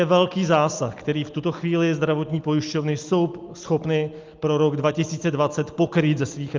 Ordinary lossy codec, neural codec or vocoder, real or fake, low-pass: Opus, 32 kbps; none; real; 7.2 kHz